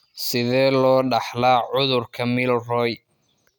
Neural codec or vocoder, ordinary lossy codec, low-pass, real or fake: none; none; 19.8 kHz; real